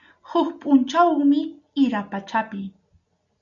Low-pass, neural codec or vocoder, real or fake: 7.2 kHz; none; real